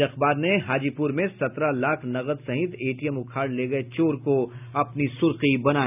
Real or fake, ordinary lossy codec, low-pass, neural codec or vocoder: real; none; 3.6 kHz; none